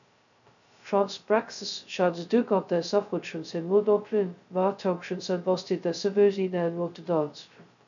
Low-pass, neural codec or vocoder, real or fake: 7.2 kHz; codec, 16 kHz, 0.2 kbps, FocalCodec; fake